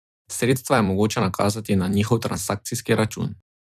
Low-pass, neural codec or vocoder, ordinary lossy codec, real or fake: 14.4 kHz; vocoder, 44.1 kHz, 128 mel bands every 256 samples, BigVGAN v2; none; fake